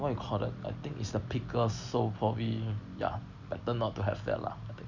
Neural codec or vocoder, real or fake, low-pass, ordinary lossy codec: none; real; 7.2 kHz; none